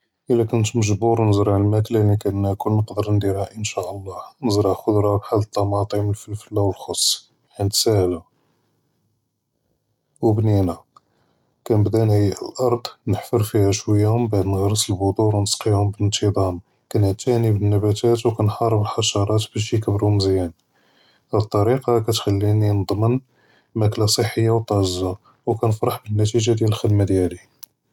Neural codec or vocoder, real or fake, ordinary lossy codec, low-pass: none; real; none; 19.8 kHz